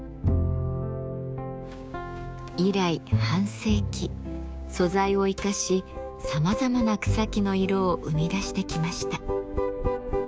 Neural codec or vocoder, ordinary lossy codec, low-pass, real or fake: codec, 16 kHz, 6 kbps, DAC; none; none; fake